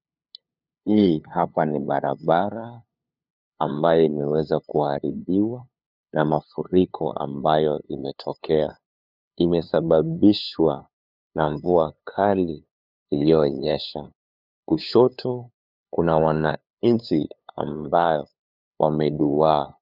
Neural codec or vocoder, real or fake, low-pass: codec, 16 kHz, 2 kbps, FunCodec, trained on LibriTTS, 25 frames a second; fake; 5.4 kHz